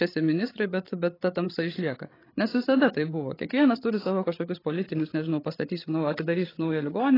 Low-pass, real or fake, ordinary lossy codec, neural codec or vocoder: 5.4 kHz; fake; AAC, 24 kbps; codec, 16 kHz, 8 kbps, FreqCodec, larger model